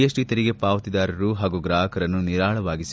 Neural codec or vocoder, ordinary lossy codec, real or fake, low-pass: none; none; real; none